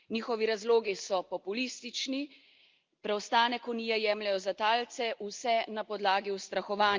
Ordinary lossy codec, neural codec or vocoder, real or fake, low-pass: Opus, 24 kbps; none; real; 7.2 kHz